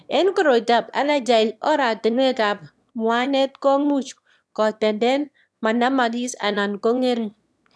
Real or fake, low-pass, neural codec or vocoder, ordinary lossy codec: fake; none; autoencoder, 22.05 kHz, a latent of 192 numbers a frame, VITS, trained on one speaker; none